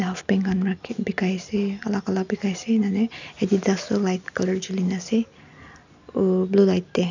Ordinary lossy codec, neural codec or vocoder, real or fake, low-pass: none; none; real; 7.2 kHz